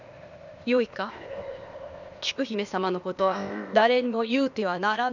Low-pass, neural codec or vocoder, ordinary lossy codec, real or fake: 7.2 kHz; codec, 16 kHz, 0.8 kbps, ZipCodec; none; fake